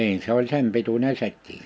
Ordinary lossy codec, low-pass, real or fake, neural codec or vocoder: none; none; real; none